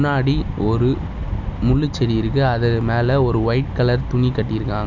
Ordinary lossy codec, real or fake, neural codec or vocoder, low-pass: none; real; none; 7.2 kHz